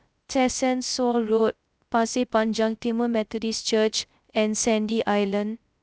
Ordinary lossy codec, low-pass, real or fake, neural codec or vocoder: none; none; fake; codec, 16 kHz, 0.3 kbps, FocalCodec